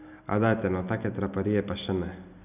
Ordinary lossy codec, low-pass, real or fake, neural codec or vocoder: none; 3.6 kHz; real; none